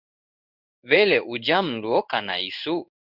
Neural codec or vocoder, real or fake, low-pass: codec, 16 kHz in and 24 kHz out, 1 kbps, XY-Tokenizer; fake; 5.4 kHz